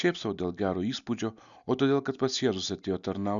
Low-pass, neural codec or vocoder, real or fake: 7.2 kHz; none; real